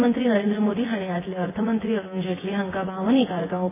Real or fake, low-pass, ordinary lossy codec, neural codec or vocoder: fake; 3.6 kHz; AAC, 16 kbps; vocoder, 24 kHz, 100 mel bands, Vocos